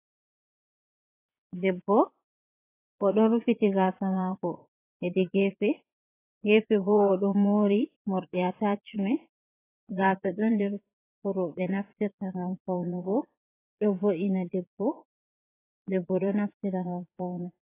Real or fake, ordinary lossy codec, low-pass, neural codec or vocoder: fake; AAC, 24 kbps; 3.6 kHz; vocoder, 22.05 kHz, 80 mel bands, Vocos